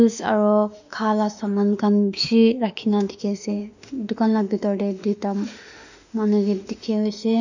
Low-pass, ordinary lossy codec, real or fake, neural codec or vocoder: 7.2 kHz; none; fake; autoencoder, 48 kHz, 32 numbers a frame, DAC-VAE, trained on Japanese speech